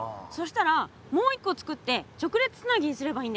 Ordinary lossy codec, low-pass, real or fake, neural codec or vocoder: none; none; real; none